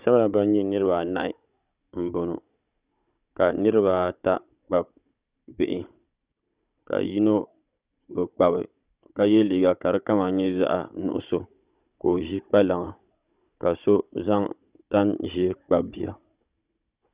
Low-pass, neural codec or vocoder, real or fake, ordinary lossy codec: 3.6 kHz; codec, 16 kHz, 16 kbps, FunCodec, trained on Chinese and English, 50 frames a second; fake; Opus, 64 kbps